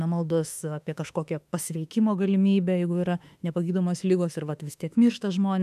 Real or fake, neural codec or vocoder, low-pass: fake; autoencoder, 48 kHz, 32 numbers a frame, DAC-VAE, trained on Japanese speech; 14.4 kHz